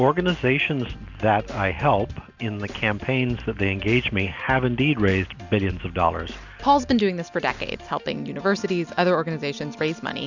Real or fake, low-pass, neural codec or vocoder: real; 7.2 kHz; none